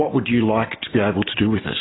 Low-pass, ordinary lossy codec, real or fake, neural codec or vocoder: 7.2 kHz; AAC, 16 kbps; real; none